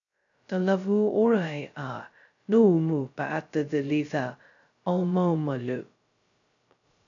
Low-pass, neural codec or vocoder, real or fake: 7.2 kHz; codec, 16 kHz, 0.2 kbps, FocalCodec; fake